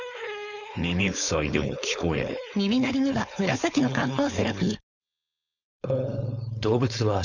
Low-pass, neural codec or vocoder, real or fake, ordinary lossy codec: 7.2 kHz; codec, 16 kHz, 4.8 kbps, FACodec; fake; none